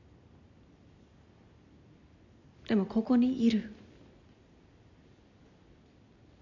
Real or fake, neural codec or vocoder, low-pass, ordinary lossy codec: real; none; 7.2 kHz; none